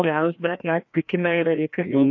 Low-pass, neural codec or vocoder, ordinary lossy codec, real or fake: 7.2 kHz; codec, 16 kHz, 1 kbps, FreqCodec, larger model; AAC, 48 kbps; fake